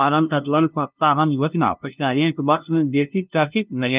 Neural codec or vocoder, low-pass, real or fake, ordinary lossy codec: codec, 16 kHz, 0.5 kbps, FunCodec, trained on LibriTTS, 25 frames a second; 3.6 kHz; fake; Opus, 32 kbps